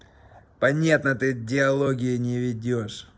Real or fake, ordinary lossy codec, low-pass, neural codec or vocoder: real; none; none; none